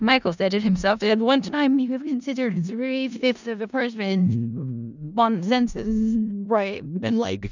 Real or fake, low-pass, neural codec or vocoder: fake; 7.2 kHz; codec, 16 kHz in and 24 kHz out, 0.4 kbps, LongCat-Audio-Codec, four codebook decoder